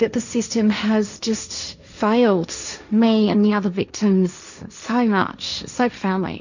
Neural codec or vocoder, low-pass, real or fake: codec, 16 kHz, 1.1 kbps, Voila-Tokenizer; 7.2 kHz; fake